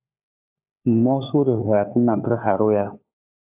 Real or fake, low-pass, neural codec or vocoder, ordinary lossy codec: fake; 3.6 kHz; codec, 16 kHz, 4 kbps, FunCodec, trained on LibriTTS, 50 frames a second; AAC, 32 kbps